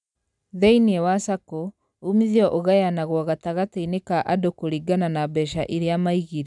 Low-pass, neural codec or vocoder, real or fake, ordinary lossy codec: 10.8 kHz; none; real; none